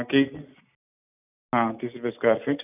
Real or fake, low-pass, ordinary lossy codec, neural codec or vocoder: real; 3.6 kHz; none; none